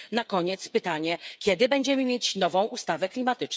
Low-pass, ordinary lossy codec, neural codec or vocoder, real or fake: none; none; codec, 16 kHz, 8 kbps, FreqCodec, smaller model; fake